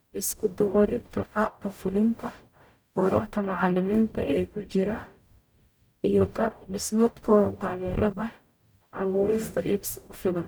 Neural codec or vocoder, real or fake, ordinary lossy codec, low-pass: codec, 44.1 kHz, 0.9 kbps, DAC; fake; none; none